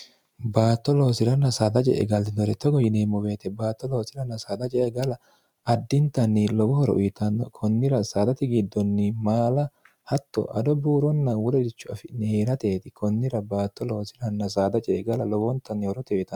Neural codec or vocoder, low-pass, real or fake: none; 19.8 kHz; real